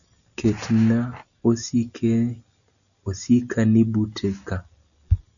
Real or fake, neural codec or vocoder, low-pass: real; none; 7.2 kHz